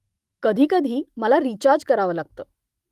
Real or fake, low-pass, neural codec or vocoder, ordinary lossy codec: fake; 14.4 kHz; autoencoder, 48 kHz, 128 numbers a frame, DAC-VAE, trained on Japanese speech; Opus, 32 kbps